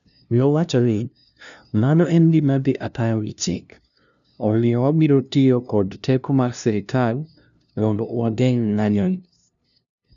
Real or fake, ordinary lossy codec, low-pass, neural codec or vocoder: fake; none; 7.2 kHz; codec, 16 kHz, 0.5 kbps, FunCodec, trained on LibriTTS, 25 frames a second